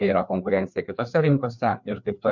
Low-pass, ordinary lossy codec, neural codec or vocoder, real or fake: 7.2 kHz; MP3, 64 kbps; codec, 16 kHz, 2 kbps, FreqCodec, larger model; fake